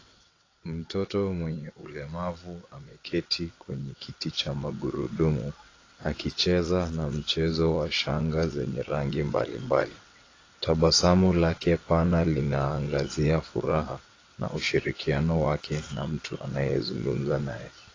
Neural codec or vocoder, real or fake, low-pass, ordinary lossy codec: vocoder, 22.05 kHz, 80 mel bands, WaveNeXt; fake; 7.2 kHz; AAC, 32 kbps